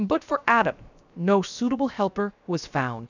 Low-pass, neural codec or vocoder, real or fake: 7.2 kHz; codec, 16 kHz, 0.7 kbps, FocalCodec; fake